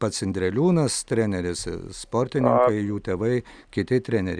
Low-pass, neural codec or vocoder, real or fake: 9.9 kHz; none; real